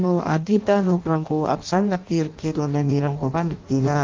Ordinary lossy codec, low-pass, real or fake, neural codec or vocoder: Opus, 24 kbps; 7.2 kHz; fake; codec, 16 kHz in and 24 kHz out, 0.6 kbps, FireRedTTS-2 codec